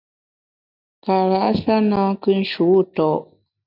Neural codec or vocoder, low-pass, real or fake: none; 5.4 kHz; real